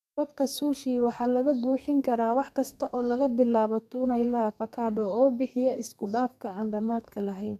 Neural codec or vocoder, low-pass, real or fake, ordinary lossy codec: codec, 32 kHz, 1.9 kbps, SNAC; 14.4 kHz; fake; none